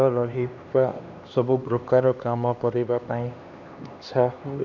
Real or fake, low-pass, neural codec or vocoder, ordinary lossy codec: fake; 7.2 kHz; codec, 16 kHz, 2 kbps, X-Codec, HuBERT features, trained on LibriSpeech; none